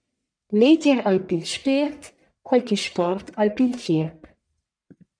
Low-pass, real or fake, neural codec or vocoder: 9.9 kHz; fake; codec, 44.1 kHz, 1.7 kbps, Pupu-Codec